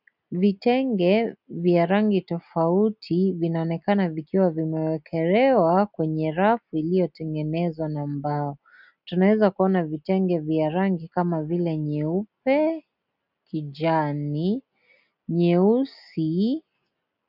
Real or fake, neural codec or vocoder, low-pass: real; none; 5.4 kHz